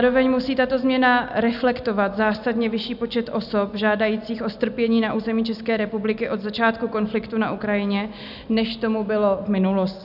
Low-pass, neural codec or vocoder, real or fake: 5.4 kHz; none; real